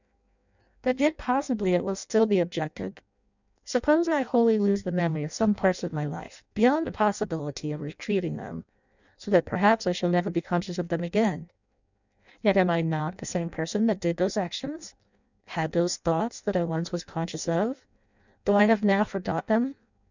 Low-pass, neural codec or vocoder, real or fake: 7.2 kHz; codec, 16 kHz in and 24 kHz out, 0.6 kbps, FireRedTTS-2 codec; fake